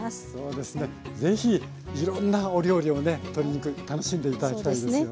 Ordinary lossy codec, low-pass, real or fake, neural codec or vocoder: none; none; real; none